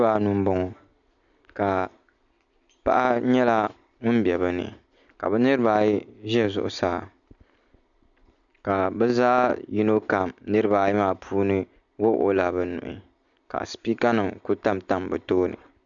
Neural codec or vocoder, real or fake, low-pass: none; real; 7.2 kHz